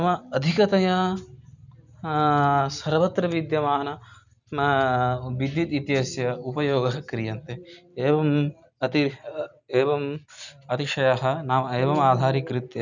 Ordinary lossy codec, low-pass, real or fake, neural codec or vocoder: none; 7.2 kHz; real; none